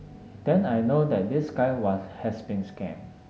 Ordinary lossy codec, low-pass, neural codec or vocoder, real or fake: none; none; none; real